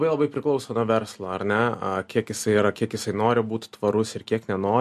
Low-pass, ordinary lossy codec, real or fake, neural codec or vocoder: 14.4 kHz; MP3, 64 kbps; real; none